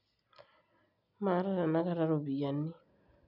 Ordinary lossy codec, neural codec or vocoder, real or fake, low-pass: none; none; real; 5.4 kHz